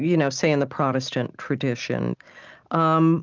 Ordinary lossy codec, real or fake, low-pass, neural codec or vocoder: Opus, 32 kbps; real; 7.2 kHz; none